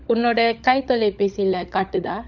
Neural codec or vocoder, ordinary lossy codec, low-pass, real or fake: codec, 16 kHz, 16 kbps, FunCodec, trained on LibriTTS, 50 frames a second; none; 7.2 kHz; fake